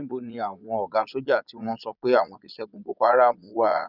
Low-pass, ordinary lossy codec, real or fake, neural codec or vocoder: 5.4 kHz; none; fake; vocoder, 22.05 kHz, 80 mel bands, Vocos